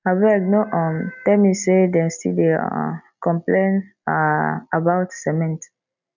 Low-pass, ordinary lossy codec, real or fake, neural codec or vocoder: 7.2 kHz; none; real; none